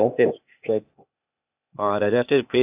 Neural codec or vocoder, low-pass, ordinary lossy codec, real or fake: codec, 16 kHz, 0.8 kbps, ZipCodec; 3.6 kHz; AAC, 32 kbps; fake